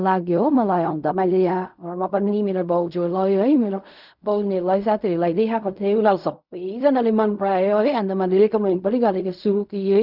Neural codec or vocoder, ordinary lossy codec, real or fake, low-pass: codec, 16 kHz in and 24 kHz out, 0.4 kbps, LongCat-Audio-Codec, fine tuned four codebook decoder; none; fake; 5.4 kHz